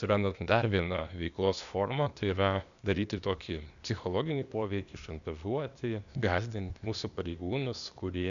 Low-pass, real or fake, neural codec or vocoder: 7.2 kHz; fake; codec, 16 kHz, 0.8 kbps, ZipCodec